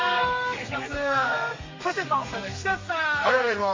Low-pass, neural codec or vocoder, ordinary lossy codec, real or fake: 7.2 kHz; codec, 32 kHz, 1.9 kbps, SNAC; MP3, 32 kbps; fake